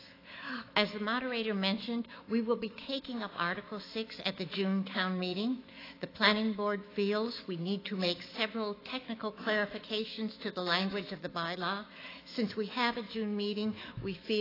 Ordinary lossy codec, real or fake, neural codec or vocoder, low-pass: AAC, 24 kbps; fake; autoencoder, 48 kHz, 128 numbers a frame, DAC-VAE, trained on Japanese speech; 5.4 kHz